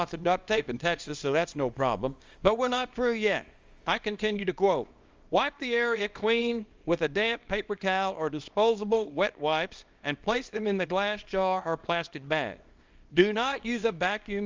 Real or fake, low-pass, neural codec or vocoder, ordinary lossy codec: fake; 7.2 kHz; codec, 24 kHz, 0.9 kbps, WavTokenizer, small release; Opus, 32 kbps